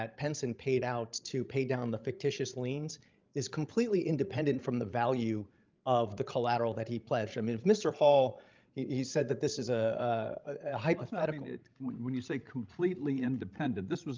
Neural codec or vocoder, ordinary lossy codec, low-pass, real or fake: codec, 16 kHz, 16 kbps, FreqCodec, larger model; Opus, 24 kbps; 7.2 kHz; fake